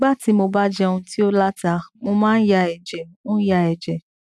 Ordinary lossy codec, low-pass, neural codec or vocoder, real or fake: none; none; none; real